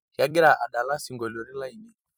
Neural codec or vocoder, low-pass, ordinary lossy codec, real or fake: vocoder, 44.1 kHz, 128 mel bands every 256 samples, BigVGAN v2; none; none; fake